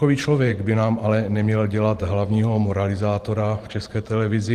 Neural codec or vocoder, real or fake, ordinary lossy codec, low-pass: vocoder, 44.1 kHz, 128 mel bands every 512 samples, BigVGAN v2; fake; Opus, 24 kbps; 14.4 kHz